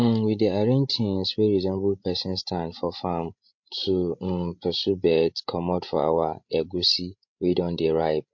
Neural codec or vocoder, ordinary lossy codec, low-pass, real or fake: codec, 16 kHz, 16 kbps, FreqCodec, larger model; MP3, 48 kbps; 7.2 kHz; fake